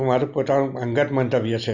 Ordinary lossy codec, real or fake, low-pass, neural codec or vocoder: none; real; 7.2 kHz; none